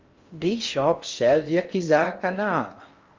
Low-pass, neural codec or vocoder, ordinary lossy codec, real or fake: 7.2 kHz; codec, 16 kHz in and 24 kHz out, 0.6 kbps, FocalCodec, streaming, 4096 codes; Opus, 32 kbps; fake